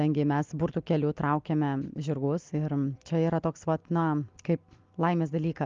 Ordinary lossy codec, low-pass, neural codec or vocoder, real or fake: Opus, 32 kbps; 7.2 kHz; none; real